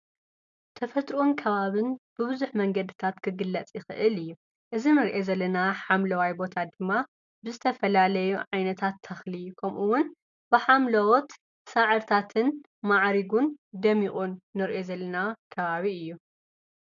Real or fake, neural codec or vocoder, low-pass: real; none; 7.2 kHz